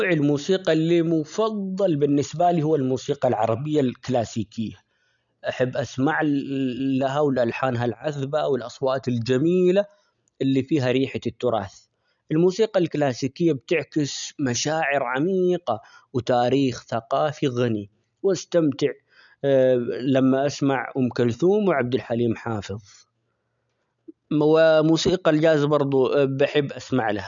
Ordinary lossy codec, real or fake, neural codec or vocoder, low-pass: none; real; none; 7.2 kHz